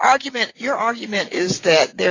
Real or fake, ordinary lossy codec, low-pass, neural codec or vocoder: fake; AAC, 32 kbps; 7.2 kHz; vocoder, 22.05 kHz, 80 mel bands, WaveNeXt